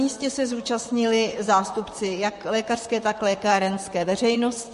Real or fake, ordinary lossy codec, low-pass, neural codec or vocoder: fake; MP3, 48 kbps; 14.4 kHz; vocoder, 44.1 kHz, 128 mel bands, Pupu-Vocoder